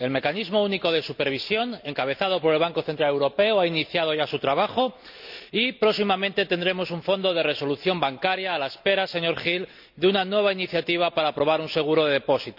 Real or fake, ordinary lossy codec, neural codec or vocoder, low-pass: real; none; none; 5.4 kHz